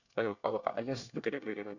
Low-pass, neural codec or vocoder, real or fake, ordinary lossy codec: 7.2 kHz; codec, 24 kHz, 1 kbps, SNAC; fake; none